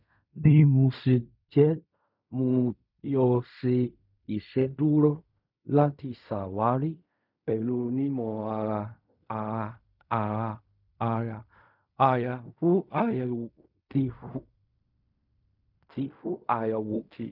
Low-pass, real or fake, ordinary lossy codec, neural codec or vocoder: 5.4 kHz; fake; none; codec, 16 kHz in and 24 kHz out, 0.4 kbps, LongCat-Audio-Codec, fine tuned four codebook decoder